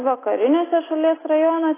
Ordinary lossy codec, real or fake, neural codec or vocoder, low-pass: AAC, 16 kbps; real; none; 3.6 kHz